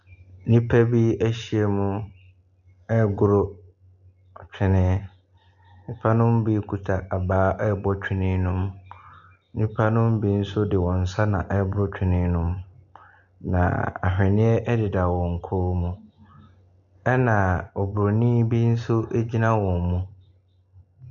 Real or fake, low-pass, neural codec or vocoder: real; 7.2 kHz; none